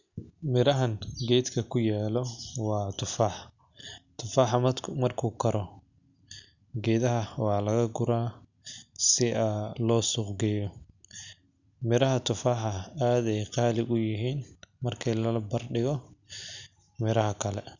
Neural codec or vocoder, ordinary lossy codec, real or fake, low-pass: none; none; real; 7.2 kHz